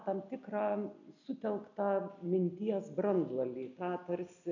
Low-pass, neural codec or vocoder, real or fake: 7.2 kHz; none; real